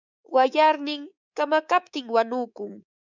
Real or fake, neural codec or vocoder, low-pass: fake; codec, 24 kHz, 3.1 kbps, DualCodec; 7.2 kHz